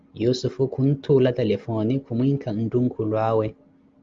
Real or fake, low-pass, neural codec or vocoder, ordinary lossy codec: real; 7.2 kHz; none; Opus, 32 kbps